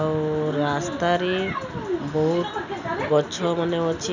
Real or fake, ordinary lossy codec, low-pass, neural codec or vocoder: real; none; 7.2 kHz; none